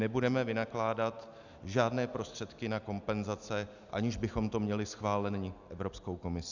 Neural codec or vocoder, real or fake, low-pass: none; real; 7.2 kHz